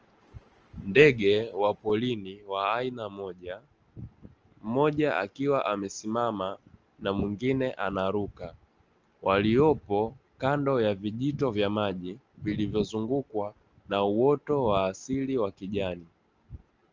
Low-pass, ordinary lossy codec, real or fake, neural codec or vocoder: 7.2 kHz; Opus, 24 kbps; real; none